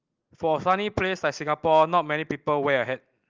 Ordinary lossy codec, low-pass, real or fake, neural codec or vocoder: Opus, 32 kbps; 7.2 kHz; real; none